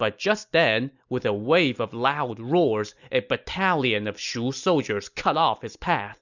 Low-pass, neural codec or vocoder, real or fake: 7.2 kHz; none; real